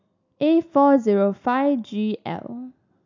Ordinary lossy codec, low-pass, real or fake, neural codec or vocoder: MP3, 64 kbps; 7.2 kHz; real; none